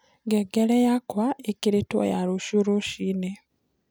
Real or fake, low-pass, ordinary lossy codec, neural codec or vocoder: real; none; none; none